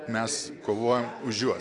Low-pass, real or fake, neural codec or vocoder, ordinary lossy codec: 10.8 kHz; real; none; AAC, 48 kbps